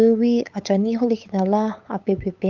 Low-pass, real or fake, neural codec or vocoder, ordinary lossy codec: 7.2 kHz; fake; codec, 16 kHz, 8 kbps, FunCodec, trained on LibriTTS, 25 frames a second; Opus, 24 kbps